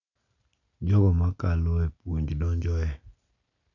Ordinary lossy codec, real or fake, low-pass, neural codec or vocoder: none; real; 7.2 kHz; none